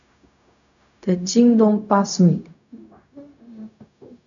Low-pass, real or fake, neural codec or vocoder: 7.2 kHz; fake; codec, 16 kHz, 0.4 kbps, LongCat-Audio-Codec